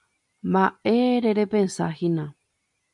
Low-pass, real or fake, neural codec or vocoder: 10.8 kHz; real; none